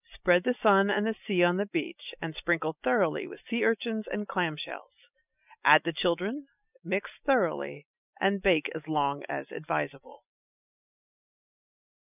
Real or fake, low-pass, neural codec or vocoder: real; 3.6 kHz; none